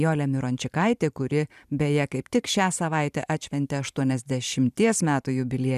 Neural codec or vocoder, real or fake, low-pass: vocoder, 44.1 kHz, 128 mel bands every 512 samples, BigVGAN v2; fake; 14.4 kHz